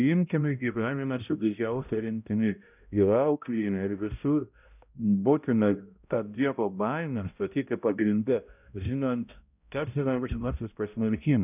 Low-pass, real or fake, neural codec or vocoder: 3.6 kHz; fake; codec, 16 kHz, 0.5 kbps, X-Codec, HuBERT features, trained on balanced general audio